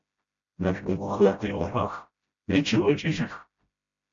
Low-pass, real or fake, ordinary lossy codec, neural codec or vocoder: 7.2 kHz; fake; AAC, 64 kbps; codec, 16 kHz, 0.5 kbps, FreqCodec, smaller model